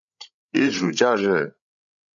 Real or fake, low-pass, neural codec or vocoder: fake; 7.2 kHz; codec, 16 kHz, 16 kbps, FreqCodec, larger model